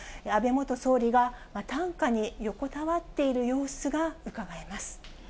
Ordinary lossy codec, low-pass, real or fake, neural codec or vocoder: none; none; real; none